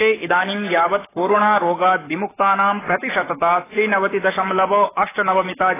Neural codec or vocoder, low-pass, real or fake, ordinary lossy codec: codec, 44.1 kHz, 7.8 kbps, Pupu-Codec; 3.6 kHz; fake; AAC, 16 kbps